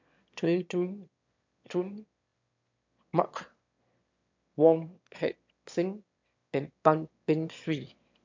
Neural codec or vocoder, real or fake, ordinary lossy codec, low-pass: autoencoder, 22.05 kHz, a latent of 192 numbers a frame, VITS, trained on one speaker; fake; MP3, 64 kbps; 7.2 kHz